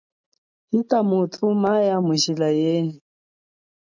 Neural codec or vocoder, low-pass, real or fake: none; 7.2 kHz; real